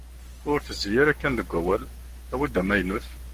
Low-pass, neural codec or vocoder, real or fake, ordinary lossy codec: 14.4 kHz; codec, 44.1 kHz, 7.8 kbps, Pupu-Codec; fake; Opus, 24 kbps